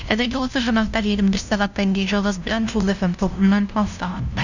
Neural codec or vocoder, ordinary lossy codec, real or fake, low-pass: codec, 16 kHz, 0.5 kbps, FunCodec, trained on LibriTTS, 25 frames a second; none; fake; 7.2 kHz